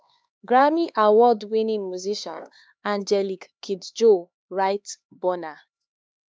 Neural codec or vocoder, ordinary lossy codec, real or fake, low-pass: codec, 16 kHz, 4 kbps, X-Codec, HuBERT features, trained on LibriSpeech; none; fake; none